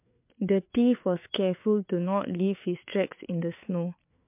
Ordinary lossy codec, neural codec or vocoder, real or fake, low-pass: MP3, 32 kbps; codec, 16 kHz, 4 kbps, FreqCodec, larger model; fake; 3.6 kHz